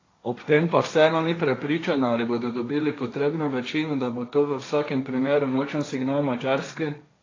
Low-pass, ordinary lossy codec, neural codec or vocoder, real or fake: 7.2 kHz; AAC, 32 kbps; codec, 16 kHz, 1.1 kbps, Voila-Tokenizer; fake